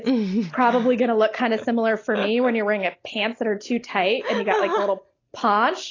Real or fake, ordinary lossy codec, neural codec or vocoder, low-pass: real; AAC, 32 kbps; none; 7.2 kHz